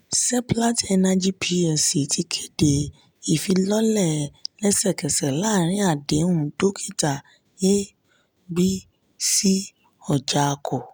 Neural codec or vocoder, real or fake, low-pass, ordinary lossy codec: none; real; none; none